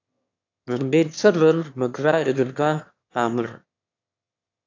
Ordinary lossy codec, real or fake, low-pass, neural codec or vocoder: AAC, 48 kbps; fake; 7.2 kHz; autoencoder, 22.05 kHz, a latent of 192 numbers a frame, VITS, trained on one speaker